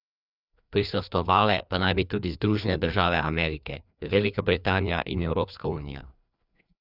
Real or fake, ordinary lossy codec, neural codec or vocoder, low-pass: fake; none; codec, 16 kHz, 2 kbps, FreqCodec, larger model; 5.4 kHz